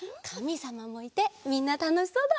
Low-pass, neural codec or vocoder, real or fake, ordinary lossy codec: none; none; real; none